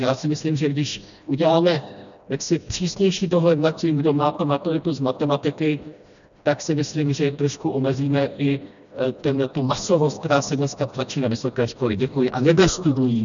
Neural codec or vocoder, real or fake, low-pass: codec, 16 kHz, 1 kbps, FreqCodec, smaller model; fake; 7.2 kHz